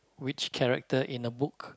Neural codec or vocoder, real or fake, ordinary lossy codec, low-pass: none; real; none; none